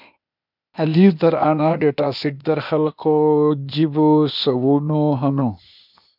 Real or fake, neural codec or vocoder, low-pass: fake; codec, 16 kHz, 0.8 kbps, ZipCodec; 5.4 kHz